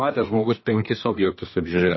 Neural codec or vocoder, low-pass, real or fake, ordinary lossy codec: codec, 24 kHz, 1 kbps, SNAC; 7.2 kHz; fake; MP3, 24 kbps